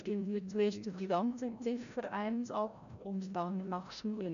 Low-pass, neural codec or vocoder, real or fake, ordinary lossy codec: 7.2 kHz; codec, 16 kHz, 0.5 kbps, FreqCodec, larger model; fake; none